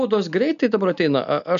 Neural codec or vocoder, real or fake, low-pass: none; real; 7.2 kHz